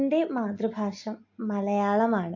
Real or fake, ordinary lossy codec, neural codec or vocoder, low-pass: real; AAC, 48 kbps; none; 7.2 kHz